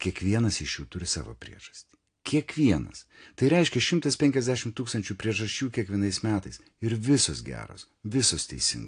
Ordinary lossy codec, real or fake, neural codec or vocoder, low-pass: AAC, 48 kbps; real; none; 9.9 kHz